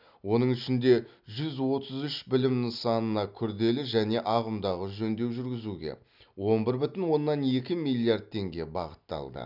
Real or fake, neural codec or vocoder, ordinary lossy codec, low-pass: real; none; none; 5.4 kHz